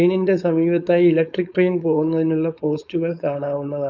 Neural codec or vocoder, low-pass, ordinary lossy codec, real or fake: codec, 16 kHz, 4.8 kbps, FACodec; 7.2 kHz; none; fake